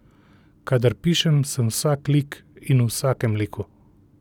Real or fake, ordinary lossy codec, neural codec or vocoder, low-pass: real; none; none; 19.8 kHz